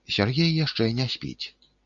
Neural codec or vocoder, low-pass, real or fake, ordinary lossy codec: none; 7.2 kHz; real; MP3, 48 kbps